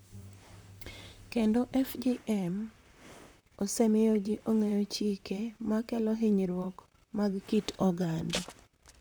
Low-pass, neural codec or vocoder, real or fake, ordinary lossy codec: none; vocoder, 44.1 kHz, 128 mel bands, Pupu-Vocoder; fake; none